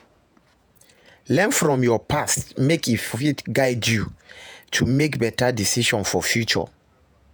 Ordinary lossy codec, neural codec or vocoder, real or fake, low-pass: none; vocoder, 48 kHz, 128 mel bands, Vocos; fake; none